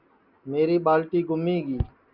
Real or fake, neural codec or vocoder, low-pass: real; none; 5.4 kHz